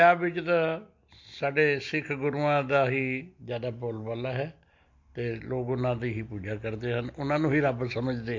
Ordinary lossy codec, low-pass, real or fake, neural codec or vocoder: MP3, 48 kbps; 7.2 kHz; real; none